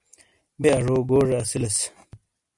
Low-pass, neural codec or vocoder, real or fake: 10.8 kHz; none; real